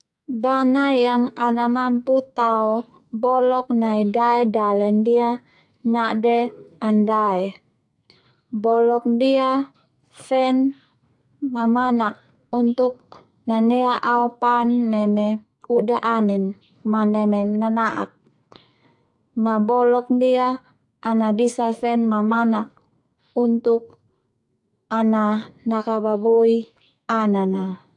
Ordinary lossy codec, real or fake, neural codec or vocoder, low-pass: AAC, 64 kbps; fake; codec, 44.1 kHz, 2.6 kbps, SNAC; 10.8 kHz